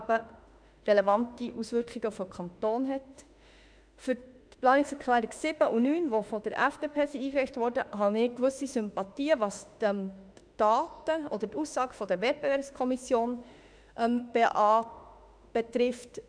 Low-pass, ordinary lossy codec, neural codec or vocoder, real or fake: 9.9 kHz; none; autoencoder, 48 kHz, 32 numbers a frame, DAC-VAE, trained on Japanese speech; fake